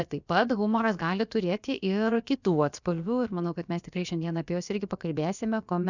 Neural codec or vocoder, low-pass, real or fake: codec, 16 kHz, about 1 kbps, DyCAST, with the encoder's durations; 7.2 kHz; fake